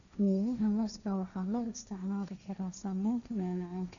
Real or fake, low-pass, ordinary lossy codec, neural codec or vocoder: fake; 7.2 kHz; none; codec, 16 kHz, 1.1 kbps, Voila-Tokenizer